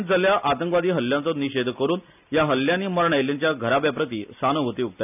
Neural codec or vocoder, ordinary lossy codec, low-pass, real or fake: none; none; 3.6 kHz; real